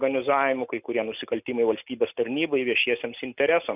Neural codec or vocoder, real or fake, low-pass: none; real; 3.6 kHz